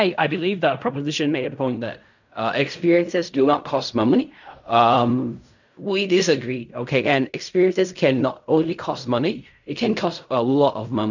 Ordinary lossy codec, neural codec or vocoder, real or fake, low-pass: none; codec, 16 kHz in and 24 kHz out, 0.4 kbps, LongCat-Audio-Codec, fine tuned four codebook decoder; fake; 7.2 kHz